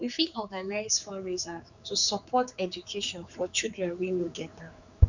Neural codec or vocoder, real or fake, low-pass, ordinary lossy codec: codec, 16 kHz, 4 kbps, X-Codec, HuBERT features, trained on general audio; fake; 7.2 kHz; none